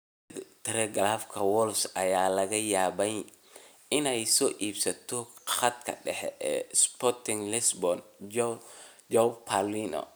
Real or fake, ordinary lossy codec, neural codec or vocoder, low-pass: real; none; none; none